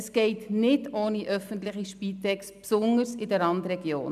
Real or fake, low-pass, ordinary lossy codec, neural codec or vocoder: real; 14.4 kHz; none; none